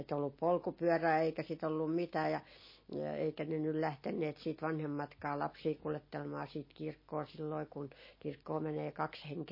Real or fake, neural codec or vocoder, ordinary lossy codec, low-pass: real; none; MP3, 24 kbps; 5.4 kHz